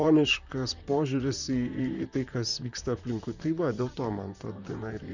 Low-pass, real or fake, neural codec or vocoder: 7.2 kHz; fake; vocoder, 44.1 kHz, 128 mel bands, Pupu-Vocoder